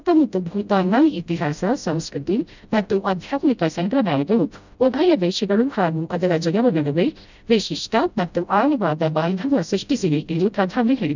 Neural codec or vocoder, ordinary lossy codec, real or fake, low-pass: codec, 16 kHz, 0.5 kbps, FreqCodec, smaller model; none; fake; 7.2 kHz